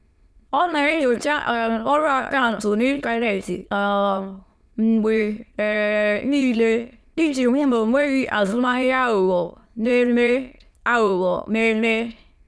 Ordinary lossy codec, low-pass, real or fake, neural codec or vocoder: none; none; fake; autoencoder, 22.05 kHz, a latent of 192 numbers a frame, VITS, trained on many speakers